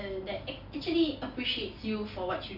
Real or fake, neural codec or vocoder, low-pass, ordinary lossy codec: real; none; 5.4 kHz; none